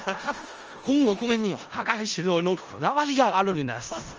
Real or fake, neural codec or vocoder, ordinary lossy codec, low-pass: fake; codec, 16 kHz in and 24 kHz out, 0.4 kbps, LongCat-Audio-Codec, four codebook decoder; Opus, 24 kbps; 7.2 kHz